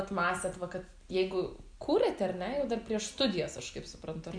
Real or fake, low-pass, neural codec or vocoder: real; 9.9 kHz; none